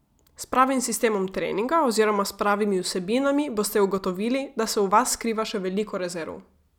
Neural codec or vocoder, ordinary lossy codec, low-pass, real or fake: none; none; 19.8 kHz; real